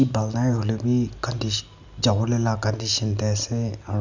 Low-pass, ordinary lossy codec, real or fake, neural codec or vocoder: 7.2 kHz; Opus, 64 kbps; real; none